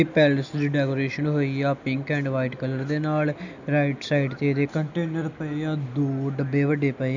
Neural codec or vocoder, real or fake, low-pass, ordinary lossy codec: none; real; 7.2 kHz; none